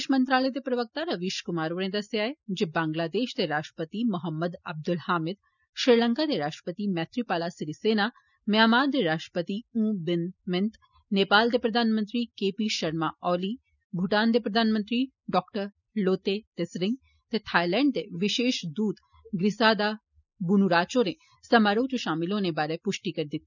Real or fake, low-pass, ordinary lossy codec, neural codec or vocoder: real; 7.2 kHz; none; none